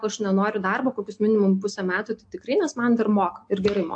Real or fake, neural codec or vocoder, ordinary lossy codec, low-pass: real; none; AAC, 64 kbps; 9.9 kHz